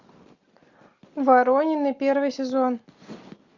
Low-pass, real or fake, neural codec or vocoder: 7.2 kHz; real; none